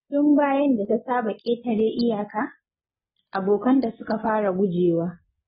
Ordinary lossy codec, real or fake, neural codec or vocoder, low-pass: AAC, 16 kbps; real; none; 7.2 kHz